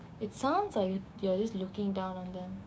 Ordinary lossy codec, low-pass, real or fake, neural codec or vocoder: none; none; fake; codec, 16 kHz, 6 kbps, DAC